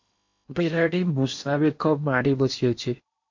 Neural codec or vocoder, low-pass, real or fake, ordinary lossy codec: codec, 16 kHz in and 24 kHz out, 0.8 kbps, FocalCodec, streaming, 65536 codes; 7.2 kHz; fake; MP3, 48 kbps